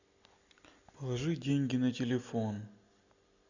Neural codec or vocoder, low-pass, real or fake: none; 7.2 kHz; real